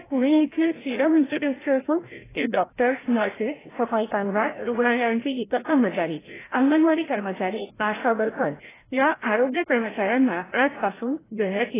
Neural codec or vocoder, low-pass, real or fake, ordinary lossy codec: codec, 16 kHz, 0.5 kbps, FreqCodec, larger model; 3.6 kHz; fake; AAC, 16 kbps